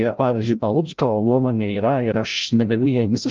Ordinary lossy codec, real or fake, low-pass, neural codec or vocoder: Opus, 24 kbps; fake; 7.2 kHz; codec, 16 kHz, 0.5 kbps, FreqCodec, larger model